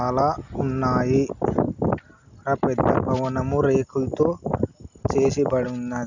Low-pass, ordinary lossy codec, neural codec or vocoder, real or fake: 7.2 kHz; none; none; real